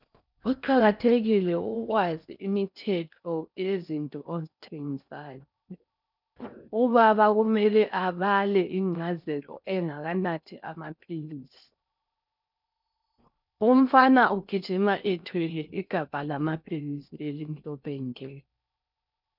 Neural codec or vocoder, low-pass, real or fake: codec, 16 kHz in and 24 kHz out, 0.6 kbps, FocalCodec, streaming, 4096 codes; 5.4 kHz; fake